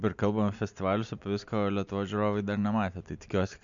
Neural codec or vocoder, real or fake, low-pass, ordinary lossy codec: none; real; 7.2 kHz; MP3, 64 kbps